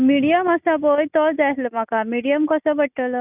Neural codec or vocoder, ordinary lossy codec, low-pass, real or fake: none; none; 3.6 kHz; real